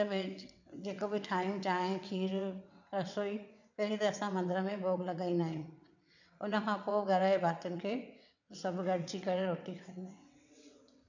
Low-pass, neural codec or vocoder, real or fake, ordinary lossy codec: 7.2 kHz; vocoder, 22.05 kHz, 80 mel bands, WaveNeXt; fake; none